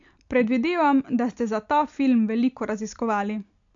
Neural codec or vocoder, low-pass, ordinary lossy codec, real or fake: none; 7.2 kHz; AAC, 64 kbps; real